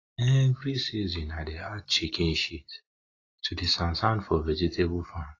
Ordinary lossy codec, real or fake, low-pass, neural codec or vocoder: AAC, 32 kbps; real; 7.2 kHz; none